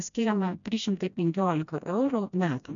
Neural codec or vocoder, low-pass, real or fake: codec, 16 kHz, 1 kbps, FreqCodec, smaller model; 7.2 kHz; fake